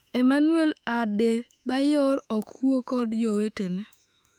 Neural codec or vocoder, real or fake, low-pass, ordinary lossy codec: autoencoder, 48 kHz, 32 numbers a frame, DAC-VAE, trained on Japanese speech; fake; 19.8 kHz; none